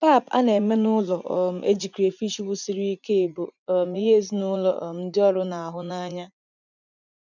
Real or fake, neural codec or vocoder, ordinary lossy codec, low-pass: fake; vocoder, 44.1 kHz, 80 mel bands, Vocos; none; 7.2 kHz